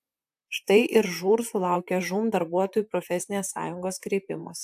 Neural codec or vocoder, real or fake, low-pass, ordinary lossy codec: vocoder, 44.1 kHz, 128 mel bands, Pupu-Vocoder; fake; 14.4 kHz; AAC, 96 kbps